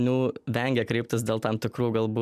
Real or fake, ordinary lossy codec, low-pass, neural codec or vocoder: real; MP3, 96 kbps; 14.4 kHz; none